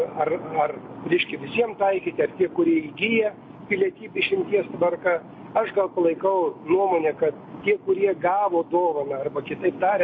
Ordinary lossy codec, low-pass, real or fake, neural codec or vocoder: MP3, 32 kbps; 7.2 kHz; real; none